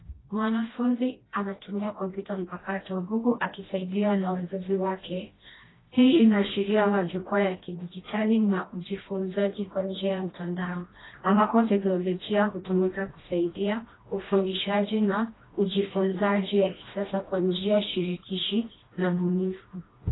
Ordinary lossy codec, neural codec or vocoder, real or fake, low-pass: AAC, 16 kbps; codec, 16 kHz, 1 kbps, FreqCodec, smaller model; fake; 7.2 kHz